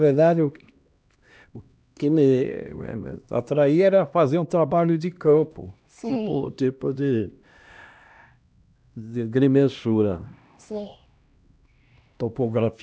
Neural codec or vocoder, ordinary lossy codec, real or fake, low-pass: codec, 16 kHz, 1 kbps, X-Codec, HuBERT features, trained on LibriSpeech; none; fake; none